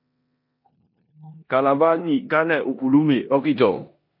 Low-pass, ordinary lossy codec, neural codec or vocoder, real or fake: 5.4 kHz; MP3, 32 kbps; codec, 16 kHz in and 24 kHz out, 0.9 kbps, LongCat-Audio-Codec, four codebook decoder; fake